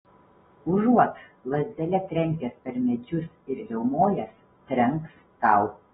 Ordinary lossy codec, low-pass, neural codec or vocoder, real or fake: AAC, 16 kbps; 7.2 kHz; none; real